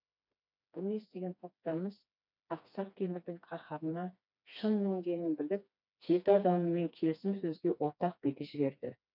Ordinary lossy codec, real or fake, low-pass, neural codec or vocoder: none; fake; 5.4 kHz; codec, 16 kHz, 2 kbps, FreqCodec, smaller model